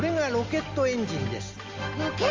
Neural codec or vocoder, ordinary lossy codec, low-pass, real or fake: none; Opus, 32 kbps; 7.2 kHz; real